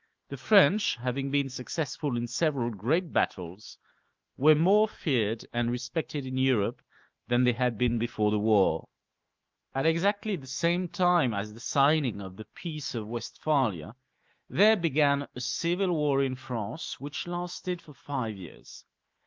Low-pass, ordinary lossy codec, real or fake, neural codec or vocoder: 7.2 kHz; Opus, 32 kbps; fake; autoencoder, 48 kHz, 128 numbers a frame, DAC-VAE, trained on Japanese speech